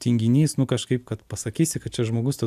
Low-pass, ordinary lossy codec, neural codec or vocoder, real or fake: 14.4 kHz; AAC, 96 kbps; none; real